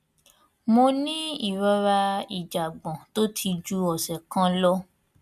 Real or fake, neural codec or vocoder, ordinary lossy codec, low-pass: real; none; none; 14.4 kHz